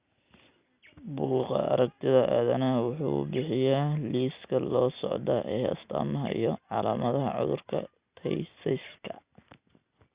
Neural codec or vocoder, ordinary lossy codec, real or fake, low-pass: none; Opus, 64 kbps; real; 3.6 kHz